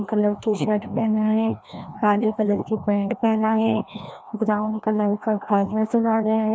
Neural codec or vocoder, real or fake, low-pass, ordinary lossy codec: codec, 16 kHz, 1 kbps, FreqCodec, larger model; fake; none; none